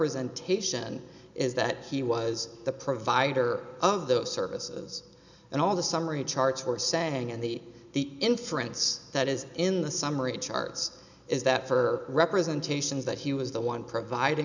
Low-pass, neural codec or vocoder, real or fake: 7.2 kHz; none; real